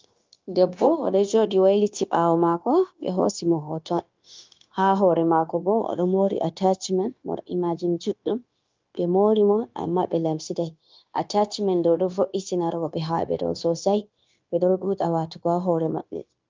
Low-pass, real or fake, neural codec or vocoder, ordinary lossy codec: 7.2 kHz; fake; codec, 16 kHz, 0.9 kbps, LongCat-Audio-Codec; Opus, 24 kbps